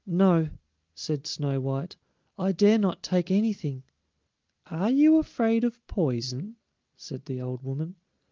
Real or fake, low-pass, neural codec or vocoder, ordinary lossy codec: real; 7.2 kHz; none; Opus, 32 kbps